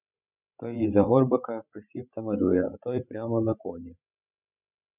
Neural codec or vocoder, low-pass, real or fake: codec, 16 kHz, 16 kbps, FreqCodec, larger model; 3.6 kHz; fake